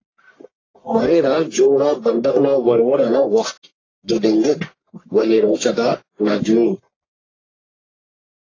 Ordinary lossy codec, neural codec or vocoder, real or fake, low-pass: AAC, 32 kbps; codec, 44.1 kHz, 1.7 kbps, Pupu-Codec; fake; 7.2 kHz